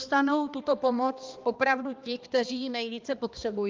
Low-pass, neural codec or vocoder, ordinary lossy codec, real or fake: 7.2 kHz; codec, 16 kHz, 2 kbps, X-Codec, HuBERT features, trained on balanced general audio; Opus, 24 kbps; fake